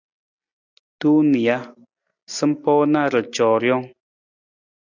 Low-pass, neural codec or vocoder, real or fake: 7.2 kHz; none; real